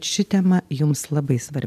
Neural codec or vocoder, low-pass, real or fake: none; 14.4 kHz; real